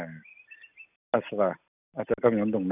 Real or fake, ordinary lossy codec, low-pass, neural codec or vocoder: real; none; 3.6 kHz; none